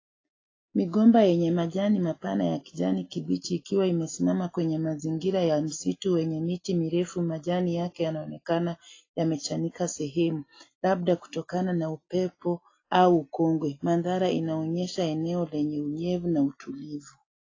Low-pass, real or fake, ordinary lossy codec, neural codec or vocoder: 7.2 kHz; real; AAC, 32 kbps; none